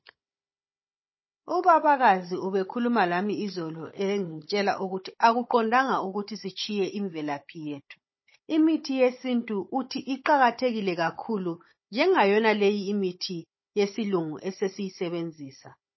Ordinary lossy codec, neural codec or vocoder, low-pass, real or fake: MP3, 24 kbps; codec, 16 kHz, 16 kbps, FunCodec, trained on Chinese and English, 50 frames a second; 7.2 kHz; fake